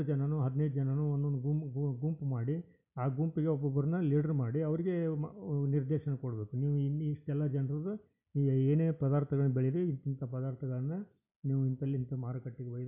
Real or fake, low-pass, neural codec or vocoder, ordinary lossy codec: real; 3.6 kHz; none; none